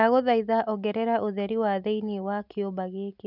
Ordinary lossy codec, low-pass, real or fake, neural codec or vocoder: none; 5.4 kHz; real; none